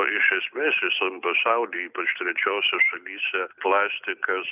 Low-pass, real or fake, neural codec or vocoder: 3.6 kHz; real; none